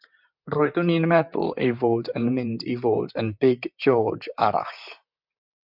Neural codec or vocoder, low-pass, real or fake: vocoder, 44.1 kHz, 128 mel bands, Pupu-Vocoder; 5.4 kHz; fake